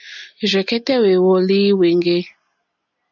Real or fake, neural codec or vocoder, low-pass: real; none; 7.2 kHz